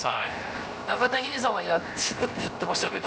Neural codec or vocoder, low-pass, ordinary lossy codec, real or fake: codec, 16 kHz, 0.7 kbps, FocalCodec; none; none; fake